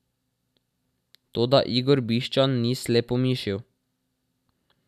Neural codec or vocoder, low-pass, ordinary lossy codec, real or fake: none; 14.4 kHz; none; real